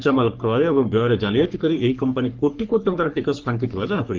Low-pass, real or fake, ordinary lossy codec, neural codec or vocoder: 7.2 kHz; fake; Opus, 32 kbps; codec, 44.1 kHz, 3.4 kbps, Pupu-Codec